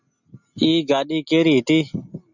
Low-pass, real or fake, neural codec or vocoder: 7.2 kHz; real; none